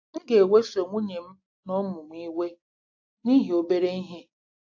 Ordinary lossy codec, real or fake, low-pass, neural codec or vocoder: AAC, 48 kbps; real; 7.2 kHz; none